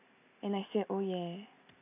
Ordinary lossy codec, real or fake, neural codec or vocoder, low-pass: AAC, 24 kbps; real; none; 3.6 kHz